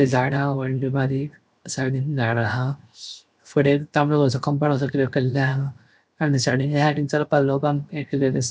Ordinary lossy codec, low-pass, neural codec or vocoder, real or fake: none; none; codec, 16 kHz, 0.7 kbps, FocalCodec; fake